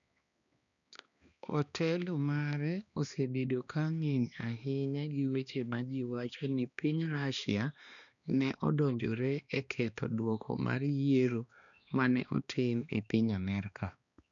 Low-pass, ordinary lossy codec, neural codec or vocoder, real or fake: 7.2 kHz; none; codec, 16 kHz, 2 kbps, X-Codec, HuBERT features, trained on balanced general audio; fake